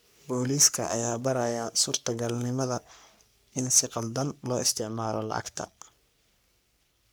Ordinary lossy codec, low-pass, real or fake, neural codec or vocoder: none; none; fake; codec, 44.1 kHz, 3.4 kbps, Pupu-Codec